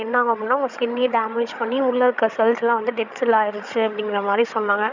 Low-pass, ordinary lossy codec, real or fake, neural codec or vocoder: 7.2 kHz; none; fake; codec, 16 kHz, 16 kbps, FreqCodec, larger model